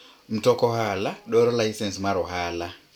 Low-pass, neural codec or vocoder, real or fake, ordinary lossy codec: 19.8 kHz; none; real; none